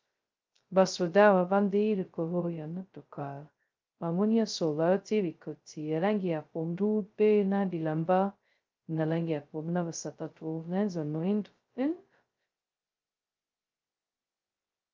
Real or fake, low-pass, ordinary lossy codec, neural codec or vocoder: fake; 7.2 kHz; Opus, 24 kbps; codec, 16 kHz, 0.2 kbps, FocalCodec